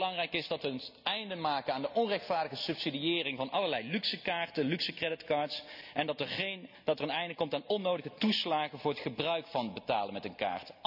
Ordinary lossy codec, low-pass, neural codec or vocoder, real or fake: none; 5.4 kHz; none; real